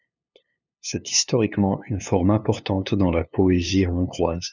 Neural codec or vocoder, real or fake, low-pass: codec, 16 kHz, 2 kbps, FunCodec, trained on LibriTTS, 25 frames a second; fake; 7.2 kHz